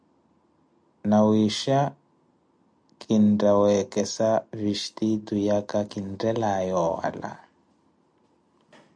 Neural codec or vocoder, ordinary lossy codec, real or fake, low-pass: none; MP3, 64 kbps; real; 9.9 kHz